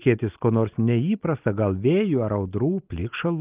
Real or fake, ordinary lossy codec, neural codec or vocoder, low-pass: real; Opus, 32 kbps; none; 3.6 kHz